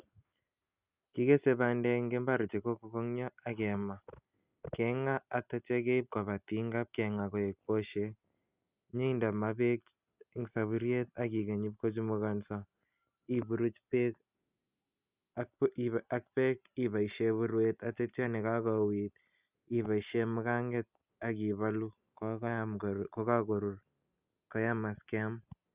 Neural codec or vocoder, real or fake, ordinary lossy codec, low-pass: none; real; Opus, 64 kbps; 3.6 kHz